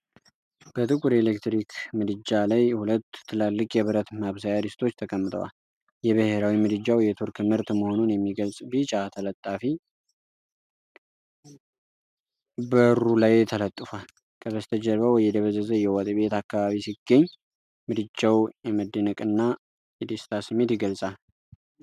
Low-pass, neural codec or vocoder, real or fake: 14.4 kHz; none; real